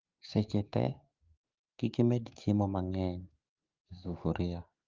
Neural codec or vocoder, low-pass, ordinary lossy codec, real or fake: codec, 44.1 kHz, 7.8 kbps, DAC; 7.2 kHz; Opus, 32 kbps; fake